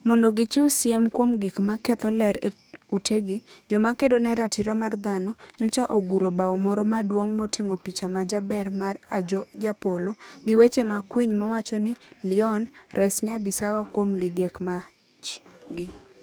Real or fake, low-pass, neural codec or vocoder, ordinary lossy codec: fake; none; codec, 44.1 kHz, 2.6 kbps, DAC; none